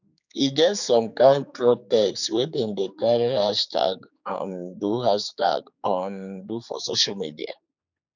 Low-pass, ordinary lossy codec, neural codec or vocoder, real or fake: 7.2 kHz; none; codec, 16 kHz, 4 kbps, X-Codec, HuBERT features, trained on general audio; fake